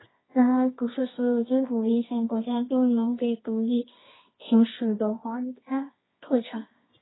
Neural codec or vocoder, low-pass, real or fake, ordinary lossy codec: codec, 24 kHz, 0.9 kbps, WavTokenizer, medium music audio release; 7.2 kHz; fake; AAC, 16 kbps